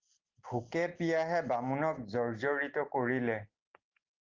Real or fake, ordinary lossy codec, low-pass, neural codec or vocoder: real; Opus, 16 kbps; 7.2 kHz; none